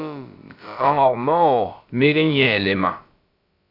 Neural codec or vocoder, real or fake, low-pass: codec, 16 kHz, about 1 kbps, DyCAST, with the encoder's durations; fake; 5.4 kHz